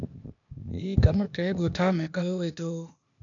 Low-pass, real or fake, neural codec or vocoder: 7.2 kHz; fake; codec, 16 kHz, 0.8 kbps, ZipCodec